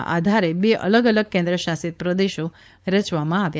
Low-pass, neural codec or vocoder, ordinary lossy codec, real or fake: none; codec, 16 kHz, 4.8 kbps, FACodec; none; fake